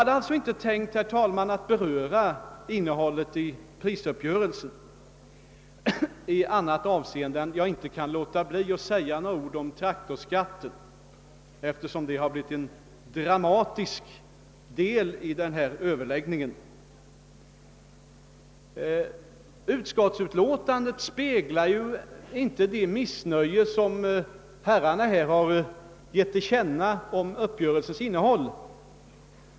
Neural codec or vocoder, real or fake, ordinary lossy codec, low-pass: none; real; none; none